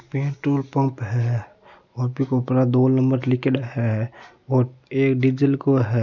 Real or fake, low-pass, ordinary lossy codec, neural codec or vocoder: real; 7.2 kHz; none; none